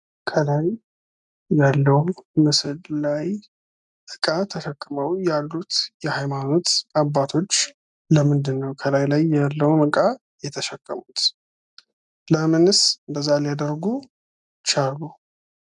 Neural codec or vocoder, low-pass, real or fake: none; 10.8 kHz; real